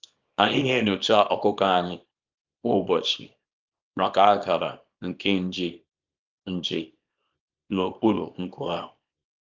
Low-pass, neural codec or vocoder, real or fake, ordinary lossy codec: 7.2 kHz; codec, 24 kHz, 0.9 kbps, WavTokenizer, small release; fake; Opus, 32 kbps